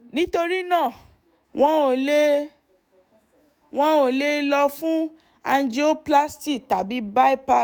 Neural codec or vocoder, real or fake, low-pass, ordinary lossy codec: autoencoder, 48 kHz, 128 numbers a frame, DAC-VAE, trained on Japanese speech; fake; none; none